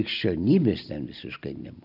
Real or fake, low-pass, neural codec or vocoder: real; 5.4 kHz; none